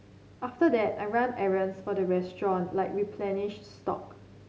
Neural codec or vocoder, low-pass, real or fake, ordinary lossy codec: none; none; real; none